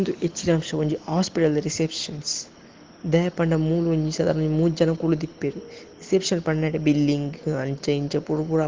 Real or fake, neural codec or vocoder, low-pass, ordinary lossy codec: real; none; 7.2 kHz; Opus, 16 kbps